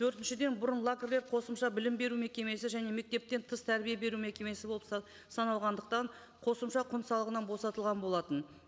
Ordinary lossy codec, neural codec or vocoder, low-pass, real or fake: none; none; none; real